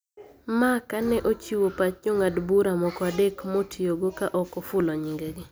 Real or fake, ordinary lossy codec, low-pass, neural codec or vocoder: real; none; none; none